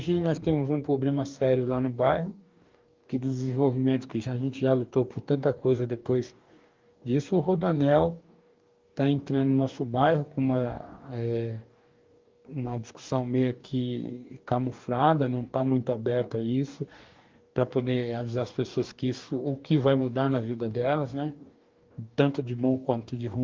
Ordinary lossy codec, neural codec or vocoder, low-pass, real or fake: Opus, 32 kbps; codec, 44.1 kHz, 2.6 kbps, DAC; 7.2 kHz; fake